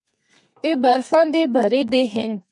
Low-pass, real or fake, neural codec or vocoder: 10.8 kHz; fake; codec, 32 kHz, 1.9 kbps, SNAC